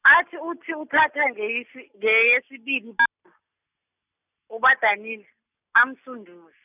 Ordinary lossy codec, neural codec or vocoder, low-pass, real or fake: none; none; 3.6 kHz; real